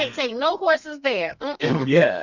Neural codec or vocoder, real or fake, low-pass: codec, 32 kHz, 1.9 kbps, SNAC; fake; 7.2 kHz